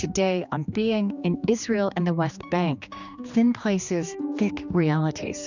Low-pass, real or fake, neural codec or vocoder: 7.2 kHz; fake; codec, 16 kHz, 2 kbps, X-Codec, HuBERT features, trained on general audio